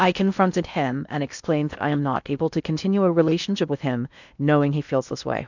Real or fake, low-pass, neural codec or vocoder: fake; 7.2 kHz; codec, 16 kHz in and 24 kHz out, 0.6 kbps, FocalCodec, streaming, 2048 codes